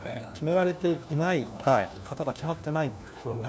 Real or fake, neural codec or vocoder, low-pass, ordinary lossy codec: fake; codec, 16 kHz, 1 kbps, FunCodec, trained on LibriTTS, 50 frames a second; none; none